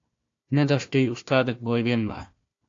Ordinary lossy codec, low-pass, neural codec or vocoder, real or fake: AAC, 48 kbps; 7.2 kHz; codec, 16 kHz, 1 kbps, FunCodec, trained on Chinese and English, 50 frames a second; fake